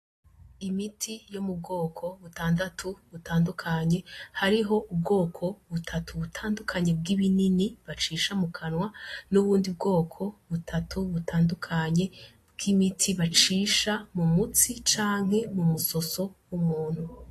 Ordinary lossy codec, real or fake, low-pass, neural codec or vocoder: AAC, 48 kbps; real; 14.4 kHz; none